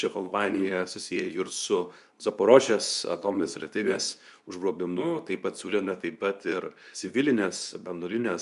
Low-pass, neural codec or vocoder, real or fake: 10.8 kHz; codec, 24 kHz, 0.9 kbps, WavTokenizer, medium speech release version 2; fake